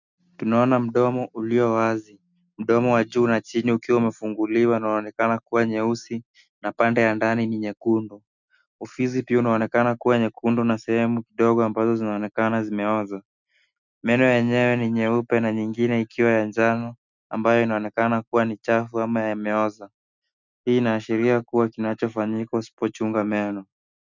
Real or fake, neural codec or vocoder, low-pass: real; none; 7.2 kHz